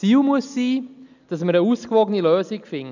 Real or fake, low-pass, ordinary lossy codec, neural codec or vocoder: real; 7.2 kHz; none; none